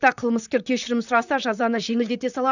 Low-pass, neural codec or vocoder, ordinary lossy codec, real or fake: 7.2 kHz; vocoder, 44.1 kHz, 128 mel bands, Pupu-Vocoder; none; fake